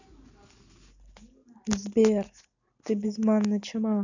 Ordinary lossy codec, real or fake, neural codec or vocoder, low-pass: none; real; none; 7.2 kHz